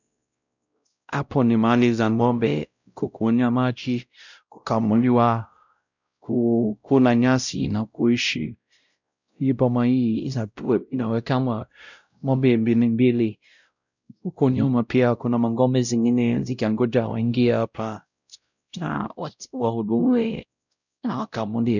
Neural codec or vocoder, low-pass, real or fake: codec, 16 kHz, 0.5 kbps, X-Codec, WavLM features, trained on Multilingual LibriSpeech; 7.2 kHz; fake